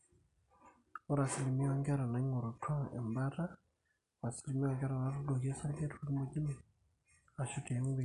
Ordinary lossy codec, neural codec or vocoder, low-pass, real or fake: none; none; 10.8 kHz; real